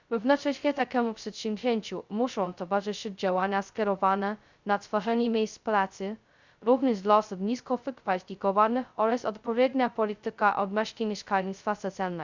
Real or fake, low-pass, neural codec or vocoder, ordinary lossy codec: fake; 7.2 kHz; codec, 16 kHz, 0.2 kbps, FocalCodec; Opus, 64 kbps